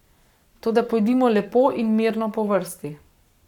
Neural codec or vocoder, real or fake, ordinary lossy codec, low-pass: codec, 44.1 kHz, 7.8 kbps, Pupu-Codec; fake; none; 19.8 kHz